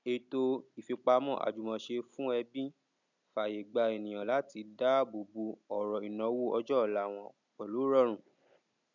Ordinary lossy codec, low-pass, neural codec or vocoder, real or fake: none; 7.2 kHz; none; real